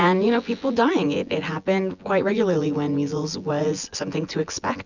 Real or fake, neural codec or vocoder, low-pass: fake; vocoder, 24 kHz, 100 mel bands, Vocos; 7.2 kHz